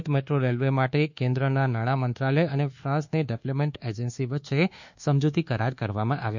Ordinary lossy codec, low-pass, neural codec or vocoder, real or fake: none; 7.2 kHz; codec, 24 kHz, 1.2 kbps, DualCodec; fake